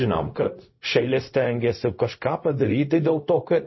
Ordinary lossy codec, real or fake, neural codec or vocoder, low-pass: MP3, 24 kbps; fake; codec, 16 kHz, 0.4 kbps, LongCat-Audio-Codec; 7.2 kHz